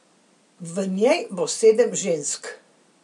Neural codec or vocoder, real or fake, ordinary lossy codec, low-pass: none; real; none; 10.8 kHz